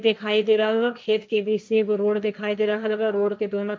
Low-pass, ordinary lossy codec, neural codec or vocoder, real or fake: none; none; codec, 16 kHz, 1.1 kbps, Voila-Tokenizer; fake